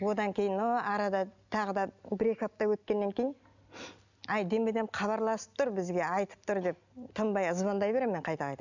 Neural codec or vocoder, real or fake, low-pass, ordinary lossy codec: none; real; 7.2 kHz; none